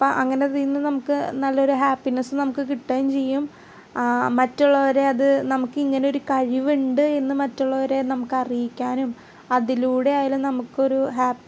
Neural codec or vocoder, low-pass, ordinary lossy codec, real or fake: none; none; none; real